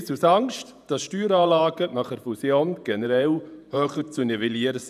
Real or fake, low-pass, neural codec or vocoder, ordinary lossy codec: fake; 14.4 kHz; vocoder, 48 kHz, 128 mel bands, Vocos; none